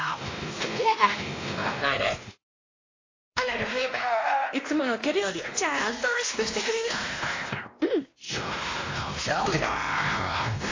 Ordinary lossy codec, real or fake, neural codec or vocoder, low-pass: AAC, 32 kbps; fake; codec, 16 kHz, 1 kbps, X-Codec, WavLM features, trained on Multilingual LibriSpeech; 7.2 kHz